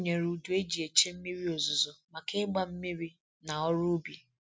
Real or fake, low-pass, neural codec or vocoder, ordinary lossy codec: real; none; none; none